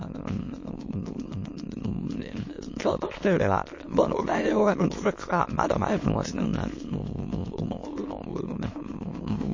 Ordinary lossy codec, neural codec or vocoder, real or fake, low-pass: MP3, 32 kbps; autoencoder, 22.05 kHz, a latent of 192 numbers a frame, VITS, trained on many speakers; fake; 7.2 kHz